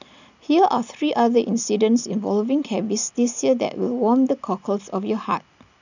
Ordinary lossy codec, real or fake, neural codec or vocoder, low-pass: none; real; none; 7.2 kHz